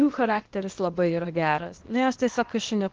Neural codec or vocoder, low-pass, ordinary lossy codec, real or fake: codec, 16 kHz, 0.8 kbps, ZipCodec; 7.2 kHz; Opus, 16 kbps; fake